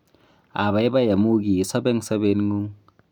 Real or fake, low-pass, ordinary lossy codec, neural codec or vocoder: real; 19.8 kHz; none; none